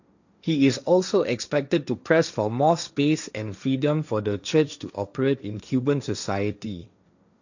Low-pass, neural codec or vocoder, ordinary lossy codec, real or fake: 7.2 kHz; codec, 16 kHz, 1.1 kbps, Voila-Tokenizer; none; fake